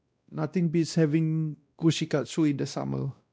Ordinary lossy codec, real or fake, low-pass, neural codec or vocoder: none; fake; none; codec, 16 kHz, 1 kbps, X-Codec, WavLM features, trained on Multilingual LibriSpeech